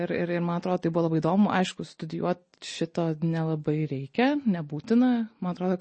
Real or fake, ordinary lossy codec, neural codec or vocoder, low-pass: real; MP3, 32 kbps; none; 9.9 kHz